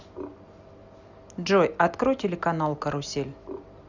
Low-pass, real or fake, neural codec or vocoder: 7.2 kHz; real; none